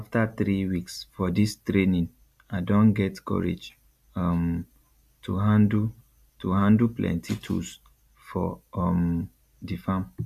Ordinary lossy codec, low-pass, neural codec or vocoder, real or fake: MP3, 96 kbps; 14.4 kHz; none; real